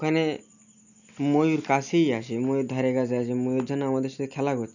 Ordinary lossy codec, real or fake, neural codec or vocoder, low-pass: none; real; none; 7.2 kHz